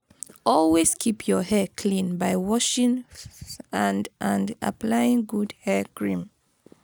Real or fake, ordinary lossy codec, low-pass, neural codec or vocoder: real; none; none; none